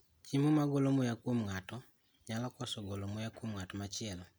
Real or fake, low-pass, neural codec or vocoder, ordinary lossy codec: real; none; none; none